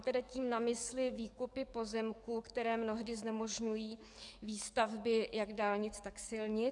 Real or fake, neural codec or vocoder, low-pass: fake; codec, 44.1 kHz, 7.8 kbps, DAC; 10.8 kHz